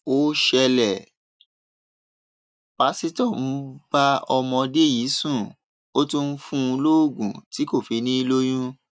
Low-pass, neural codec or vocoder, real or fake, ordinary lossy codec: none; none; real; none